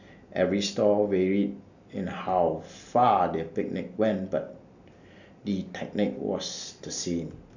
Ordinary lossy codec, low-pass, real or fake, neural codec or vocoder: none; 7.2 kHz; real; none